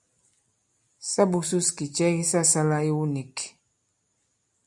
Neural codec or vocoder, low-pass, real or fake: none; 10.8 kHz; real